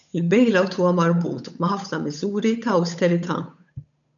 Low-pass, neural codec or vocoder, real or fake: 7.2 kHz; codec, 16 kHz, 8 kbps, FunCodec, trained on Chinese and English, 25 frames a second; fake